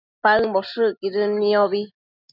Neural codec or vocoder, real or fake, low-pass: none; real; 5.4 kHz